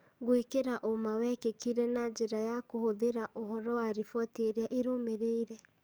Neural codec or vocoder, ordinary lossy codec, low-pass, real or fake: codec, 44.1 kHz, 7.8 kbps, DAC; none; none; fake